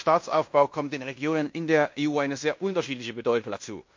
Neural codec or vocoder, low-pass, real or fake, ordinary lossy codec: codec, 16 kHz in and 24 kHz out, 0.9 kbps, LongCat-Audio-Codec, fine tuned four codebook decoder; 7.2 kHz; fake; MP3, 48 kbps